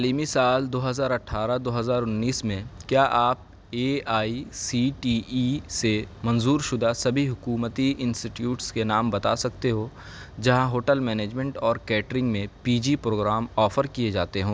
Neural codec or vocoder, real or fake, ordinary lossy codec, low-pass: none; real; none; none